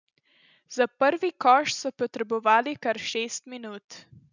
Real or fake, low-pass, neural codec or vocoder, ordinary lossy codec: real; 7.2 kHz; none; none